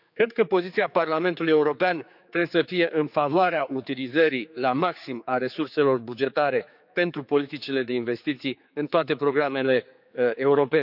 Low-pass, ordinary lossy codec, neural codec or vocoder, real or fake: 5.4 kHz; none; codec, 16 kHz, 4 kbps, X-Codec, HuBERT features, trained on general audio; fake